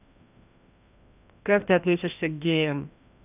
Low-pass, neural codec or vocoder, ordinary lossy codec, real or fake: 3.6 kHz; codec, 16 kHz, 1 kbps, FreqCodec, larger model; none; fake